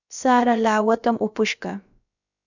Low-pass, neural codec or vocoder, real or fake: 7.2 kHz; codec, 16 kHz, about 1 kbps, DyCAST, with the encoder's durations; fake